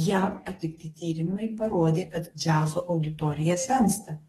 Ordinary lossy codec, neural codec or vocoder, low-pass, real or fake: AAC, 32 kbps; codec, 44.1 kHz, 2.6 kbps, DAC; 19.8 kHz; fake